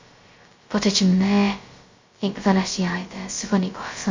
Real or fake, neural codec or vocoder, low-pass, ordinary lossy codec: fake; codec, 16 kHz, 0.2 kbps, FocalCodec; 7.2 kHz; MP3, 48 kbps